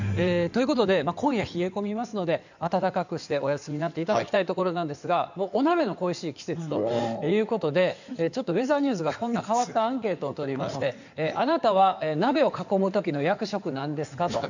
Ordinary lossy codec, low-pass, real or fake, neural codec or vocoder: none; 7.2 kHz; fake; codec, 16 kHz in and 24 kHz out, 2.2 kbps, FireRedTTS-2 codec